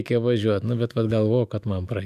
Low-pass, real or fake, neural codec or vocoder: 14.4 kHz; fake; autoencoder, 48 kHz, 128 numbers a frame, DAC-VAE, trained on Japanese speech